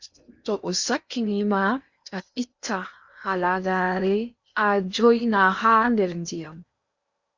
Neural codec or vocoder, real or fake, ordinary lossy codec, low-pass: codec, 16 kHz in and 24 kHz out, 0.8 kbps, FocalCodec, streaming, 65536 codes; fake; Opus, 64 kbps; 7.2 kHz